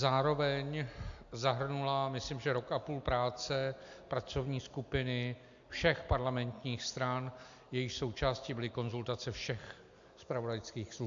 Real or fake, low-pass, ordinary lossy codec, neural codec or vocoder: real; 7.2 kHz; MP3, 64 kbps; none